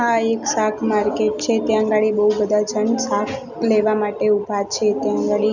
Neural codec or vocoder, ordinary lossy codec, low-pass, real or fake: none; none; 7.2 kHz; real